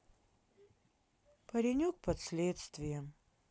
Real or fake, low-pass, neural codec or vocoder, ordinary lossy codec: real; none; none; none